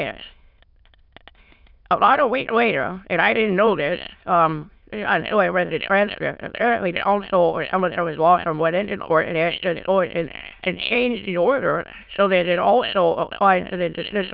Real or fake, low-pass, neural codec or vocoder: fake; 5.4 kHz; autoencoder, 22.05 kHz, a latent of 192 numbers a frame, VITS, trained on many speakers